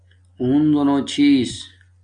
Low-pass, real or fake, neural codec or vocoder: 9.9 kHz; real; none